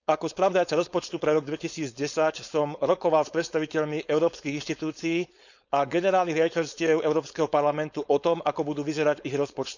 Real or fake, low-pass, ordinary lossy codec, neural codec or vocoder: fake; 7.2 kHz; none; codec, 16 kHz, 4.8 kbps, FACodec